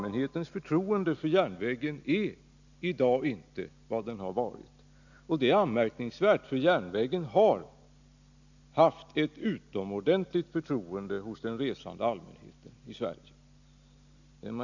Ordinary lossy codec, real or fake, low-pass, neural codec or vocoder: none; real; 7.2 kHz; none